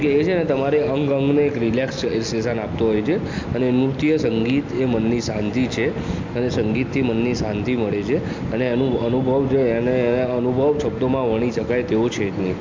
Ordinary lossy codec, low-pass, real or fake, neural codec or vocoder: MP3, 64 kbps; 7.2 kHz; real; none